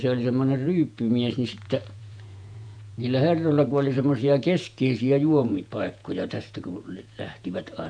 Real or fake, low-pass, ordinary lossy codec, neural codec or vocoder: fake; 9.9 kHz; none; vocoder, 48 kHz, 128 mel bands, Vocos